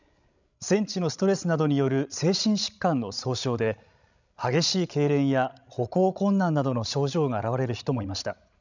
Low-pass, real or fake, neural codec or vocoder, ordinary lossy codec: 7.2 kHz; fake; codec, 16 kHz, 16 kbps, FreqCodec, larger model; none